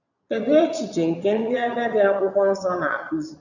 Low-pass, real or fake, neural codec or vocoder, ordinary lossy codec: 7.2 kHz; fake; vocoder, 22.05 kHz, 80 mel bands, Vocos; none